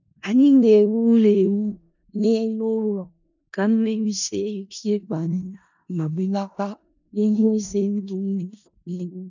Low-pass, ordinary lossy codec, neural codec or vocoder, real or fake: 7.2 kHz; none; codec, 16 kHz in and 24 kHz out, 0.4 kbps, LongCat-Audio-Codec, four codebook decoder; fake